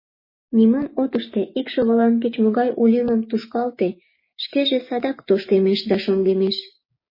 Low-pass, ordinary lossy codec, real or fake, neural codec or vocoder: 5.4 kHz; MP3, 24 kbps; fake; codec, 16 kHz, 6 kbps, DAC